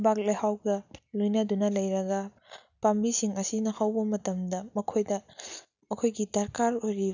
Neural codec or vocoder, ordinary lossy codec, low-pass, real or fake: none; none; 7.2 kHz; real